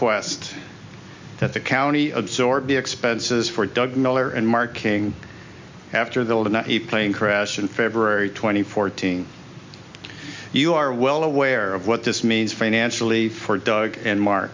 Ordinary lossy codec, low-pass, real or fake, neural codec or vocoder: MP3, 64 kbps; 7.2 kHz; real; none